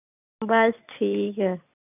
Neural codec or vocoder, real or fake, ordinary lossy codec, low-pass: none; real; none; 3.6 kHz